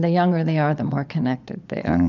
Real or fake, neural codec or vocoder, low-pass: fake; vocoder, 22.05 kHz, 80 mel bands, Vocos; 7.2 kHz